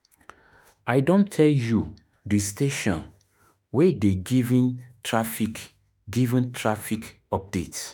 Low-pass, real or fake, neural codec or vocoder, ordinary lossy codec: none; fake; autoencoder, 48 kHz, 32 numbers a frame, DAC-VAE, trained on Japanese speech; none